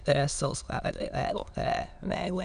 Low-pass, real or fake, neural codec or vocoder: 9.9 kHz; fake; autoencoder, 22.05 kHz, a latent of 192 numbers a frame, VITS, trained on many speakers